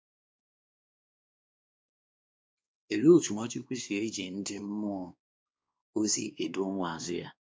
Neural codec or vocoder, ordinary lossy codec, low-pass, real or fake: codec, 16 kHz, 2 kbps, X-Codec, WavLM features, trained on Multilingual LibriSpeech; none; none; fake